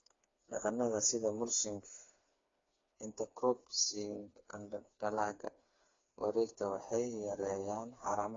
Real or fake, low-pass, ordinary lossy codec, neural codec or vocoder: fake; 7.2 kHz; AAC, 32 kbps; codec, 16 kHz, 4 kbps, FreqCodec, smaller model